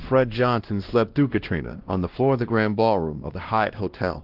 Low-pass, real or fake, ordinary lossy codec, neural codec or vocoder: 5.4 kHz; fake; Opus, 16 kbps; codec, 16 kHz, 1 kbps, X-Codec, WavLM features, trained on Multilingual LibriSpeech